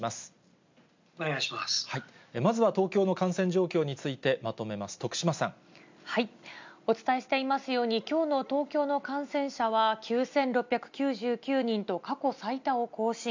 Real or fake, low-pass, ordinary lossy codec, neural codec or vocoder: real; 7.2 kHz; MP3, 64 kbps; none